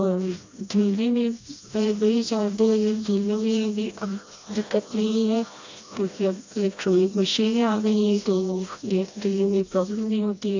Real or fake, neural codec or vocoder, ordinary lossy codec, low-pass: fake; codec, 16 kHz, 1 kbps, FreqCodec, smaller model; AAC, 48 kbps; 7.2 kHz